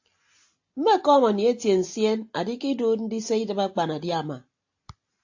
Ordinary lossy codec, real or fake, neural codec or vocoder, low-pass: AAC, 48 kbps; real; none; 7.2 kHz